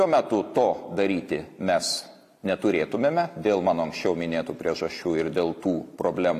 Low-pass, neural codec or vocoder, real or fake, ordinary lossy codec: 14.4 kHz; none; real; AAC, 64 kbps